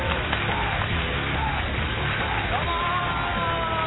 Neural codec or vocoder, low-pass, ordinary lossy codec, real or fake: none; 7.2 kHz; AAC, 16 kbps; real